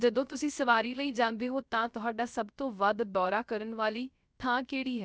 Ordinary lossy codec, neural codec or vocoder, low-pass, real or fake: none; codec, 16 kHz, 0.3 kbps, FocalCodec; none; fake